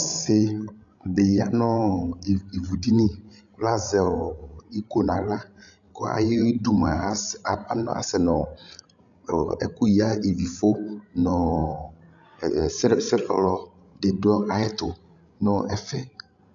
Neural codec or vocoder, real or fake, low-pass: codec, 16 kHz, 16 kbps, FreqCodec, larger model; fake; 7.2 kHz